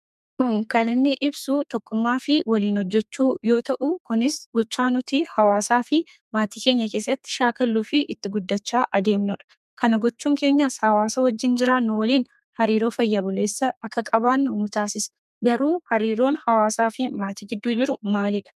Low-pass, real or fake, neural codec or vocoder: 14.4 kHz; fake; codec, 32 kHz, 1.9 kbps, SNAC